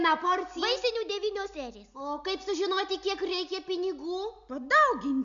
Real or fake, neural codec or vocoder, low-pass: real; none; 7.2 kHz